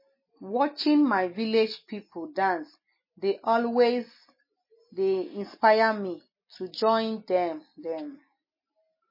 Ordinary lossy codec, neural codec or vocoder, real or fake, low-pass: MP3, 24 kbps; none; real; 5.4 kHz